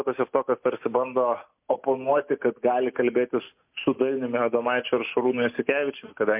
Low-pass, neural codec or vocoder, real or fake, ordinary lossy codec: 3.6 kHz; none; real; MP3, 32 kbps